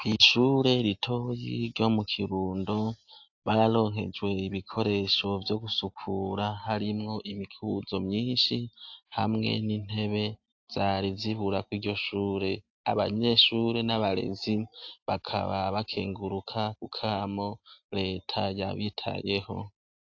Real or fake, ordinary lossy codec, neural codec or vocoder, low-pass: real; AAC, 48 kbps; none; 7.2 kHz